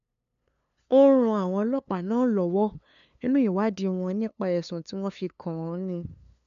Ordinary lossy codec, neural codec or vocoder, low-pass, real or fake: AAC, 96 kbps; codec, 16 kHz, 2 kbps, FunCodec, trained on LibriTTS, 25 frames a second; 7.2 kHz; fake